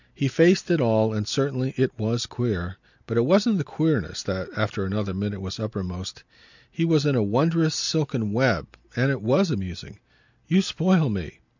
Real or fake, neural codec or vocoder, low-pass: real; none; 7.2 kHz